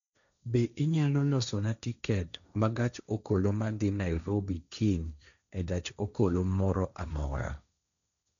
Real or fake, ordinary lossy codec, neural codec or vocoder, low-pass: fake; none; codec, 16 kHz, 1.1 kbps, Voila-Tokenizer; 7.2 kHz